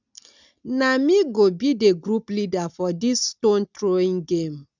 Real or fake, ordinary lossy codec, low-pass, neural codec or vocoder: real; none; 7.2 kHz; none